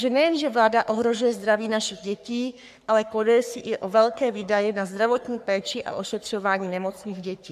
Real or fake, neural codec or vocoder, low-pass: fake; codec, 44.1 kHz, 3.4 kbps, Pupu-Codec; 14.4 kHz